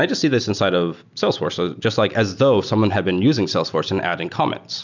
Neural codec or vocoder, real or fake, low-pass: none; real; 7.2 kHz